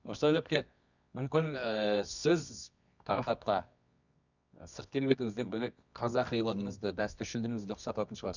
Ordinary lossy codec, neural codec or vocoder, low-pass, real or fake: none; codec, 24 kHz, 0.9 kbps, WavTokenizer, medium music audio release; 7.2 kHz; fake